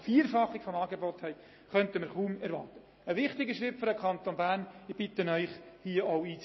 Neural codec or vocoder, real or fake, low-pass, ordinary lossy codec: none; real; 7.2 kHz; MP3, 24 kbps